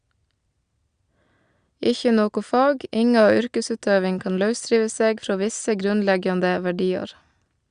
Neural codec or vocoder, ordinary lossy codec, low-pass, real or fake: none; Opus, 64 kbps; 9.9 kHz; real